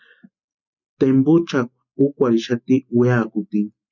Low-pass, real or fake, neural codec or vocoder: 7.2 kHz; real; none